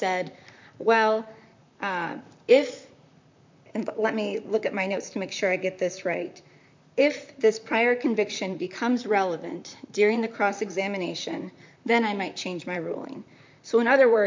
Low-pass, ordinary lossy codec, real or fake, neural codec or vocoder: 7.2 kHz; MP3, 64 kbps; fake; vocoder, 44.1 kHz, 128 mel bands, Pupu-Vocoder